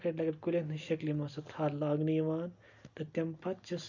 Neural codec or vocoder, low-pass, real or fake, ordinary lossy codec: none; 7.2 kHz; real; none